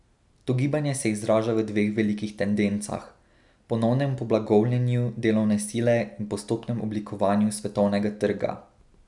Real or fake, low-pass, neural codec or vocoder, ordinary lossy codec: real; 10.8 kHz; none; none